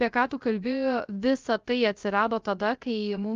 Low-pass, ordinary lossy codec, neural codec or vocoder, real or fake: 7.2 kHz; Opus, 32 kbps; codec, 16 kHz, 0.3 kbps, FocalCodec; fake